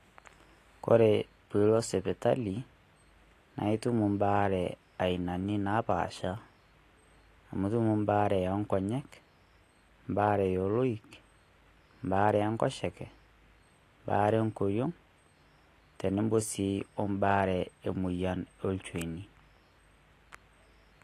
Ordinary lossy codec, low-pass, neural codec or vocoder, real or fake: AAC, 48 kbps; 14.4 kHz; none; real